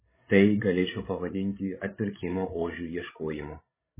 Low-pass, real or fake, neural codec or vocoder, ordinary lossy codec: 3.6 kHz; fake; codec, 16 kHz, 16 kbps, FreqCodec, larger model; MP3, 16 kbps